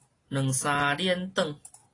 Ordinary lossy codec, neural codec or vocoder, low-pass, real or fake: AAC, 32 kbps; none; 10.8 kHz; real